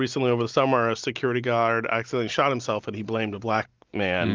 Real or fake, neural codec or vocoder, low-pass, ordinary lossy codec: real; none; 7.2 kHz; Opus, 32 kbps